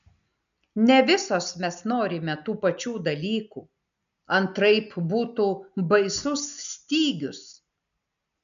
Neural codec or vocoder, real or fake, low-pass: none; real; 7.2 kHz